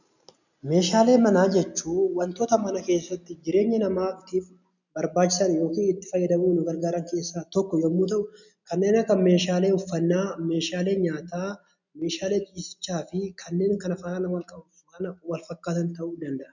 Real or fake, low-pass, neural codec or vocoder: real; 7.2 kHz; none